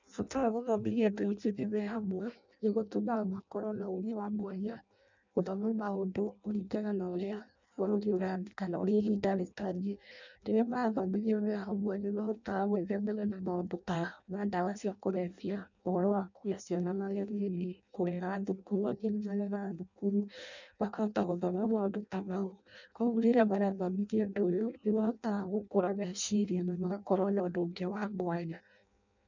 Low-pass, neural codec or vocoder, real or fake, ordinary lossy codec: 7.2 kHz; codec, 16 kHz in and 24 kHz out, 0.6 kbps, FireRedTTS-2 codec; fake; none